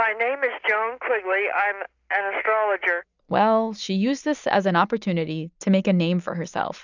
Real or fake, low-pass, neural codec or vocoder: real; 7.2 kHz; none